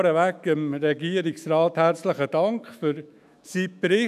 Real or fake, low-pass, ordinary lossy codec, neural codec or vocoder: fake; 14.4 kHz; none; autoencoder, 48 kHz, 128 numbers a frame, DAC-VAE, trained on Japanese speech